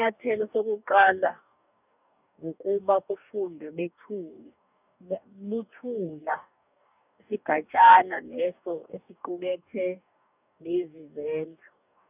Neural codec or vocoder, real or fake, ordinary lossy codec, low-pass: codec, 44.1 kHz, 2.6 kbps, DAC; fake; none; 3.6 kHz